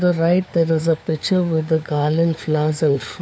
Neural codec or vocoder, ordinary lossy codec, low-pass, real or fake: codec, 16 kHz, 4 kbps, FunCodec, trained on LibriTTS, 50 frames a second; none; none; fake